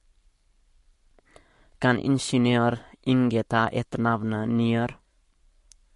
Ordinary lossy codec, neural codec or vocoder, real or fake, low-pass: MP3, 48 kbps; codec, 44.1 kHz, 7.8 kbps, Pupu-Codec; fake; 14.4 kHz